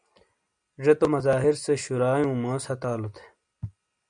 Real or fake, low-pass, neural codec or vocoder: real; 9.9 kHz; none